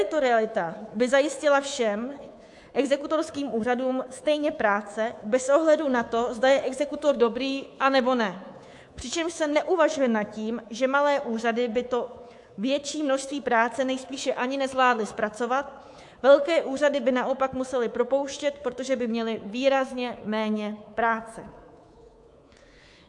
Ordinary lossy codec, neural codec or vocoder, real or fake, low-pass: AAC, 64 kbps; codec, 24 kHz, 3.1 kbps, DualCodec; fake; 10.8 kHz